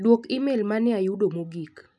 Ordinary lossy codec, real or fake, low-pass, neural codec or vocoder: none; real; none; none